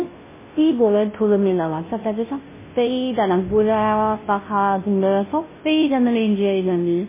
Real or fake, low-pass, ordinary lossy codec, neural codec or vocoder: fake; 3.6 kHz; MP3, 16 kbps; codec, 16 kHz, 0.5 kbps, FunCodec, trained on Chinese and English, 25 frames a second